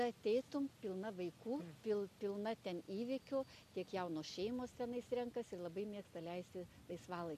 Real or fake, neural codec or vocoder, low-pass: real; none; 14.4 kHz